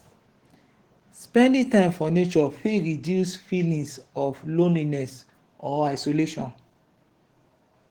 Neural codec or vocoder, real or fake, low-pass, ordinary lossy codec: codec, 44.1 kHz, 7.8 kbps, DAC; fake; 19.8 kHz; Opus, 16 kbps